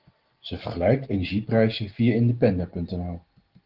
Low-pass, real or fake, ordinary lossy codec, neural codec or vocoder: 5.4 kHz; real; Opus, 16 kbps; none